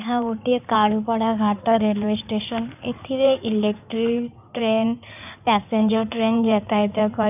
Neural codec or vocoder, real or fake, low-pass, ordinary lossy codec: codec, 16 kHz in and 24 kHz out, 2.2 kbps, FireRedTTS-2 codec; fake; 3.6 kHz; none